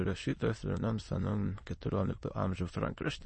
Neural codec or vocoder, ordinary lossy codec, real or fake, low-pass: autoencoder, 22.05 kHz, a latent of 192 numbers a frame, VITS, trained on many speakers; MP3, 32 kbps; fake; 9.9 kHz